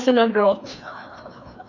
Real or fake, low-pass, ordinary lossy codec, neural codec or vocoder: fake; 7.2 kHz; none; codec, 16 kHz, 2 kbps, FreqCodec, larger model